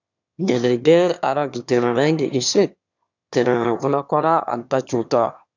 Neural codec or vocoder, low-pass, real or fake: autoencoder, 22.05 kHz, a latent of 192 numbers a frame, VITS, trained on one speaker; 7.2 kHz; fake